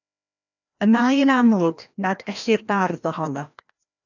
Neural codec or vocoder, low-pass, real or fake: codec, 16 kHz, 1 kbps, FreqCodec, larger model; 7.2 kHz; fake